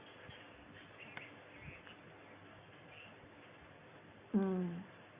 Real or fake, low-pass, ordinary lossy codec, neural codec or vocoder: real; 3.6 kHz; Opus, 64 kbps; none